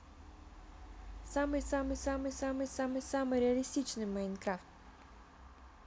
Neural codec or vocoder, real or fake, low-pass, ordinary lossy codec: none; real; none; none